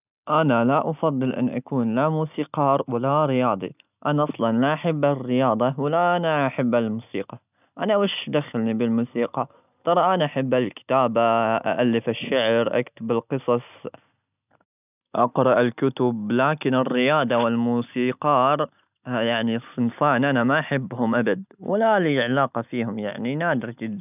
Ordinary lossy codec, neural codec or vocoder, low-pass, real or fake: none; none; 3.6 kHz; real